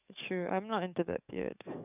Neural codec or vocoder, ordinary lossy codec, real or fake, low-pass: codec, 44.1 kHz, 7.8 kbps, DAC; none; fake; 3.6 kHz